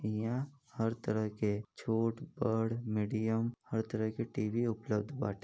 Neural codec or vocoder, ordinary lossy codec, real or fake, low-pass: none; none; real; none